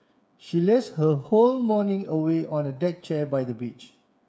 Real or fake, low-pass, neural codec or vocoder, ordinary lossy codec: fake; none; codec, 16 kHz, 8 kbps, FreqCodec, smaller model; none